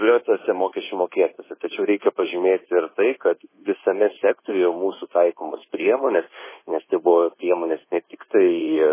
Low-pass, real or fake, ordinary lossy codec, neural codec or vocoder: 3.6 kHz; fake; MP3, 16 kbps; codec, 24 kHz, 1.2 kbps, DualCodec